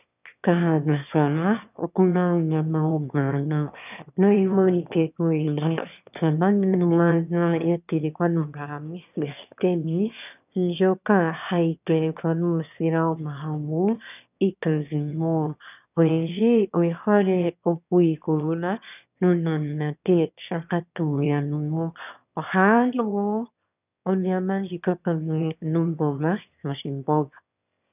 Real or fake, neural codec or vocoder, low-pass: fake; autoencoder, 22.05 kHz, a latent of 192 numbers a frame, VITS, trained on one speaker; 3.6 kHz